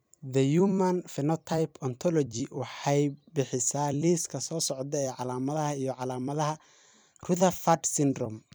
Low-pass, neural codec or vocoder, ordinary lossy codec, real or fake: none; vocoder, 44.1 kHz, 128 mel bands every 256 samples, BigVGAN v2; none; fake